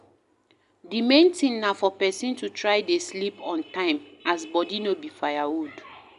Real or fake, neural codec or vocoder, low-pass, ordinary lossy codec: real; none; 10.8 kHz; none